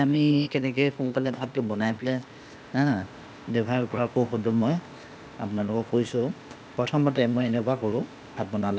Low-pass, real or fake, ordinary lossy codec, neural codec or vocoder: none; fake; none; codec, 16 kHz, 0.8 kbps, ZipCodec